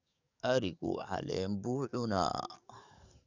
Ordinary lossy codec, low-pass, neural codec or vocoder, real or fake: none; 7.2 kHz; codec, 44.1 kHz, 7.8 kbps, DAC; fake